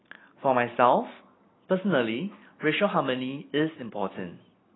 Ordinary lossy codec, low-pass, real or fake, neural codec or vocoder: AAC, 16 kbps; 7.2 kHz; real; none